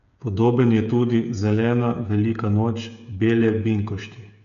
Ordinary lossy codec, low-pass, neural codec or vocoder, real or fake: none; 7.2 kHz; codec, 16 kHz, 8 kbps, FreqCodec, smaller model; fake